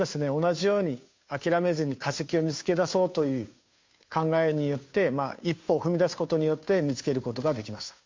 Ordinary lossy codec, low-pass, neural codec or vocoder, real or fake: MP3, 48 kbps; 7.2 kHz; codec, 16 kHz, 2 kbps, FunCodec, trained on Chinese and English, 25 frames a second; fake